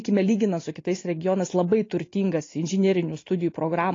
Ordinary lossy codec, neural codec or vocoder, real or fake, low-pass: AAC, 32 kbps; none; real; 7.2 kHz